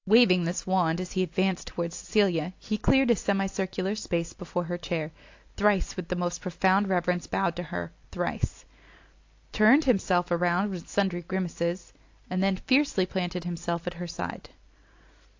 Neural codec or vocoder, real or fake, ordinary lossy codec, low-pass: none; real; AAC, 48 kbps; 7.2 kHz